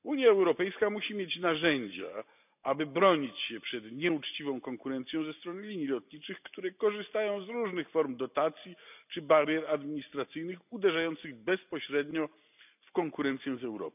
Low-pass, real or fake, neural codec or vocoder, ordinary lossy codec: 3.6 kHz; real; none; none